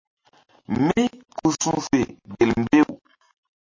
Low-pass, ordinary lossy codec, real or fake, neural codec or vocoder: 7.2 kHz; MP3, 32 kbps; real; none